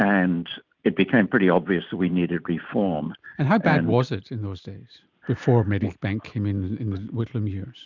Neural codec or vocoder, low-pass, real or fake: none; 7.2 kHz; real